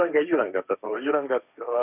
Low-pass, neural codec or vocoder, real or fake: 3.6 kHz; codec, 16 kHz, 1.1 kbps, Voila-Tokenizer; fake